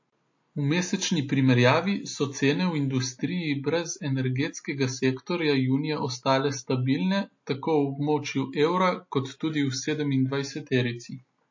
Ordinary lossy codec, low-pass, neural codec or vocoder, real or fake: MP3, 32 kbps; 7.2 kHz; none; real